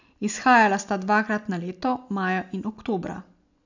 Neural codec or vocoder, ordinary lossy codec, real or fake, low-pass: none; none; real; 7.2 kHz